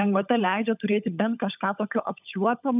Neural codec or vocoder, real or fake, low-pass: codec, 16 kHz, 16 kbps, FunCodec, trained on LibriTTS, 50 frames a second; fake; 3.6 kHz